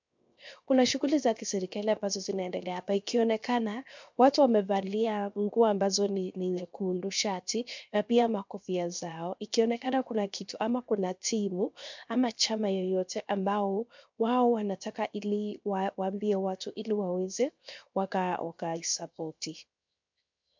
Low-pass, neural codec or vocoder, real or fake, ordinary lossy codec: 7.2 kHz; codec, 16 kHz, 0.7 kbps, FocalCodec; fake; MP3, 64 kbps